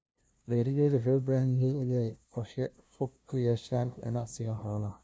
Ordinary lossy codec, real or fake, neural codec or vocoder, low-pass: none; fake; codec, 16 kHz, 0.5 kbps, FunCodec, trained on LibriTTS, 25 frames a second; none